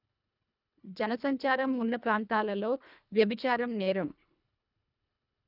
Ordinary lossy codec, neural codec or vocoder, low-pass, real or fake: none; codec, 24 kHz, 1.5 kbps, HILCodec; 5.4 kHz; fake